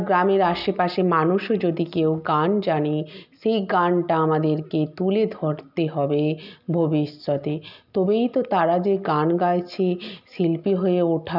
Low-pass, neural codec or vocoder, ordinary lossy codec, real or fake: 5.4 kHz; none; none; real